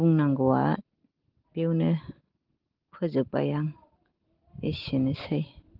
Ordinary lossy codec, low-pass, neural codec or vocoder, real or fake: Opus, 16 kbps; 5.4 kHz; none; real